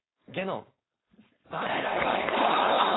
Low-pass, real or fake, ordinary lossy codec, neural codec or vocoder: 7.2 kHz; fake; AAC, 16 kbps; codec, 16 kHz, 4.8 kbps, FACodec